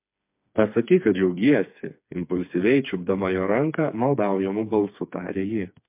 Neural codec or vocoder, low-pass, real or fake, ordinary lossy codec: codec, 16 kHz, 4 kbps, FreqCodec, smaller model; 3.6 kHz; fake; MP3, 32 kbps